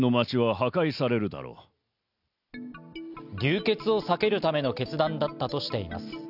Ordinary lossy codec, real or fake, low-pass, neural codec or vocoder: none; real; 5.4 kHz; none